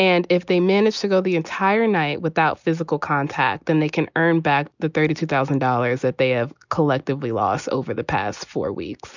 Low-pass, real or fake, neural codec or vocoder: 7.2 kHz; real; none